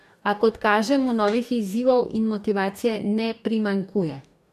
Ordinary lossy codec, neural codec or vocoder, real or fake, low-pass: none; codec, 44.1 kHz, 2.6 kbps, DAC; fake; 14.4 kHz